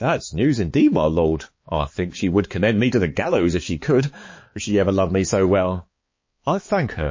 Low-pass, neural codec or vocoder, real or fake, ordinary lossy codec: 7.2 kHz; codec, 16 kHz, 4 kbps, X-Codec, HuBERT features, trained on balanced general audio; fake; MP3, 32 kbps